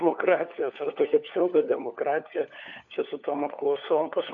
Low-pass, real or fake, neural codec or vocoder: 7.2 kHz; fake; codec, 16 kHz, 4 kbps, FunCodec, trained on LibriTTS, 50 frames a second